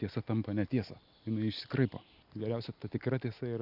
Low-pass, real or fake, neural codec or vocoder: 5.4 kHz; real; none